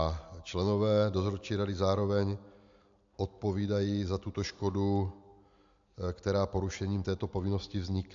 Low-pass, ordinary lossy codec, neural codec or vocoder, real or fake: 7.2 kHz; AAC, 64 kbps; none; real